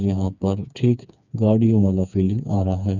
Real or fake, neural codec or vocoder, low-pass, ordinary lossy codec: fake; codec, 16 kHz, 4 kbps, FreqCodec, smaller model; 7.2 kHz; none